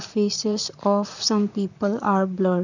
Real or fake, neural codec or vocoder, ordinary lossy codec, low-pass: fake; vocoder, 44.1 kHz, 128 mel bands, Pupu-Vocoder; none; 7.2 kHz